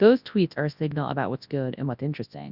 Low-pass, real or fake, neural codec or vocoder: 5.4 kHz; fake; codec, 24 kHz, 0.9 kbps, WavTokenizer, large speech release